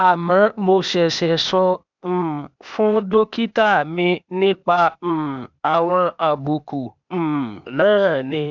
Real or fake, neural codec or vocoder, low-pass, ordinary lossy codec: fake; codec, 16 kHz, 0.8 kbps, ZipCodec; 7.2 kHz; none